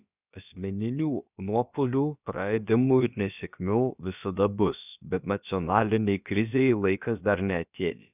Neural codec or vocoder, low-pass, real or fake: codec, 16 kHz, about 1 kbps, DyCAST, with the encoder's durations; 3.6 kHz; fake